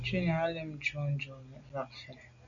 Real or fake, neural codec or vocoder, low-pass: real; none; 7.2 kHz